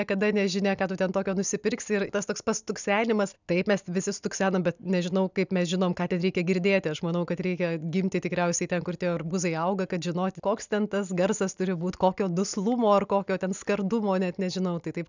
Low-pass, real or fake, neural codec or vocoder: 7.2 kHz; real; none